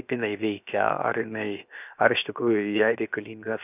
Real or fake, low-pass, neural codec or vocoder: fake; 3.6 kHz; codec, 16 kHz, 0.7 kbps, FocalCodec